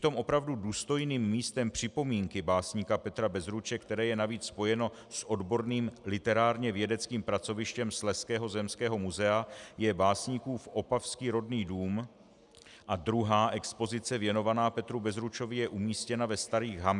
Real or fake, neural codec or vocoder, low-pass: real; none; 10.8 kHz